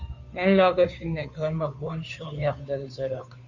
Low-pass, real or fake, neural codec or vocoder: 7.2 kHz; fake; codec, 16 kHz, 2 kbps, FunCodec, trained on Chinese and English, 25 frames a second